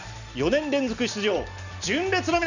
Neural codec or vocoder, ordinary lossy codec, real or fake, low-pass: none; none; real; 7.2 kHz